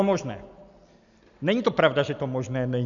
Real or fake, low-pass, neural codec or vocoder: real; 7.2 kHz; none